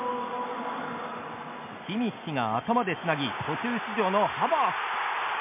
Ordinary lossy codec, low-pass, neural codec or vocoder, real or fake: MP3, 32 kbps; 3.6 kHz; none; real